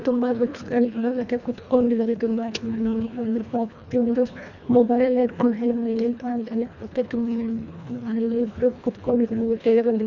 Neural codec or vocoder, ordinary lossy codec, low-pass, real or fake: codec, 24 kHz, 1.5 kbps, HILCodec; none; 7.2 kHz; fake